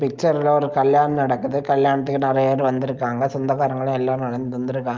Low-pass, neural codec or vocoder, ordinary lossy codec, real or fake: 7.2 kHz; none; Opus, 32 kbps; real